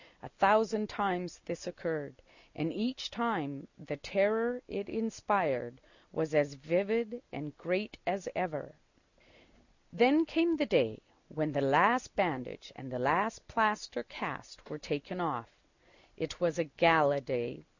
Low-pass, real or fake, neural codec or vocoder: 7.2 kHz; real; none